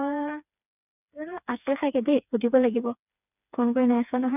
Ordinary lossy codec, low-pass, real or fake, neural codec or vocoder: none; 3.6 kHz; fake; codec, 16 kHz, 4 kbps, FreqCodec, smaller model